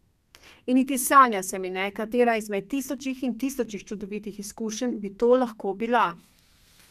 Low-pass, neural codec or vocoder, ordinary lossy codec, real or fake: 14.4 kHz; codec, 32 kHz, 1.9 kbps, SNAC; none; fake